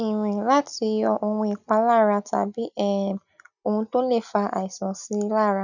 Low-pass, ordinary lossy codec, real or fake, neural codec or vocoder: 7.2 kHz; none; real; none